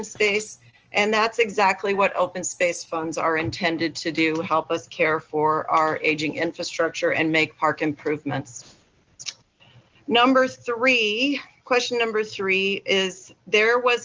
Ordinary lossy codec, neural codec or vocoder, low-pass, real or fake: Opus, 24 kbps; none; 7.2 kHz; real